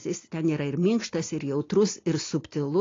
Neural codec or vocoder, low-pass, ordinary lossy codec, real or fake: none; 7.2 kHz; AAC, 32 kbps; real